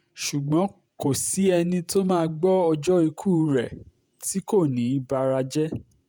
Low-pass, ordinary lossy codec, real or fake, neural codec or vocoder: none; none; fake; vocoder, 48 kHz, 128 mel bands, Vocos